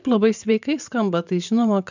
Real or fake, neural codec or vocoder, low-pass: fake; vocoder, 44.1 kHz, 128 mel bands every 512 samples, BigVGAN v2; 7.2 kHz